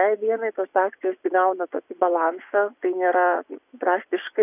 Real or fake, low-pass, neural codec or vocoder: fake; 3.6 kHz; vocoder, 24 kHz, 100 mel bands, Vocos